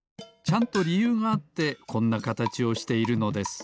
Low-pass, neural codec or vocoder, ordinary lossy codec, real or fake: none; none; none; real